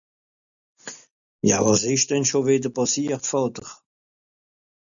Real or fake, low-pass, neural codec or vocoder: real; 7.2 kHz; none